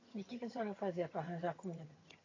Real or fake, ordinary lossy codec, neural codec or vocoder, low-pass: fake; AAC, 32 kbps; vocoder, 22.05 kHz, 80 mel bands, HiFi-GAN; 7.2 kHz